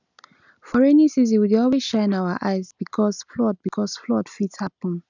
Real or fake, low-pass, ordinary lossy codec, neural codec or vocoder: real; 7.2 kHz; none; none